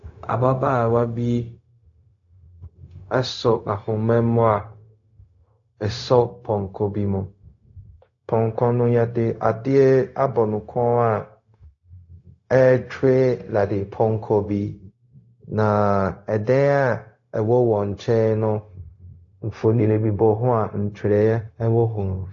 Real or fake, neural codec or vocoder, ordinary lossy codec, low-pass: fake; codec, 16 kHz, 0.4 kbps, LongCat-Audio-Codec; AAC, 48 kbps; 7.2 kHz